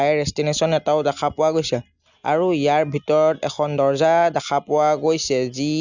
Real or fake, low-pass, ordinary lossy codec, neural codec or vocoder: real; 7.2 kHz; none; none